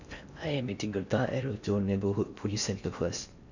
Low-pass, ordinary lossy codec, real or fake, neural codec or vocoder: 7.2 kHz; none; fake; codec, 16 kHz in and 24 kHz out, 0.8 kbps, FocalCodec, streaming, 65536 codes